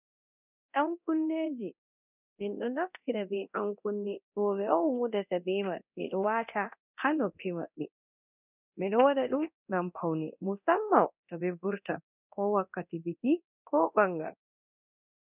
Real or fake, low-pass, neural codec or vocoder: fake; 3.6 kHz; codec, 24 kHz, 0.9 kbps, DualCodec